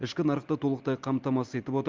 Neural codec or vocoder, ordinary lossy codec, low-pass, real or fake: none; Opus, 32 kbps; 7.2 kHz; real